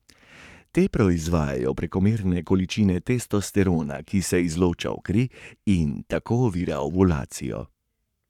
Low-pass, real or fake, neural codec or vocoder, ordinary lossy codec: 19.8 kHz; fake; codec, 44.1 kHz, 7.8 kbps, Pupu-Codec; none